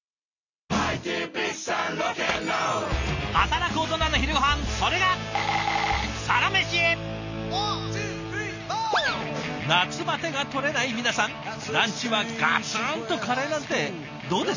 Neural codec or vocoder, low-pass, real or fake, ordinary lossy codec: none; 7.2 kHz; real; none